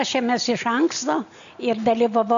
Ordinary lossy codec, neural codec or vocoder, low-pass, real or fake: MP3, 64 kbps; none; 7.2 kHz; real